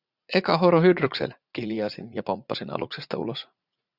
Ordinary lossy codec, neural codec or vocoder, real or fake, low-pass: Opus, 64 kbps; vocoder, 44.1 kHz, 80 mel bands, Vocos; fake; 5.4 kHz